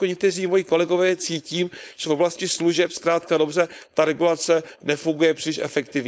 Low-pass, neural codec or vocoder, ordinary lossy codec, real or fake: none; codec, 16 kHz, 4.8 kbps, FACodec; none; fake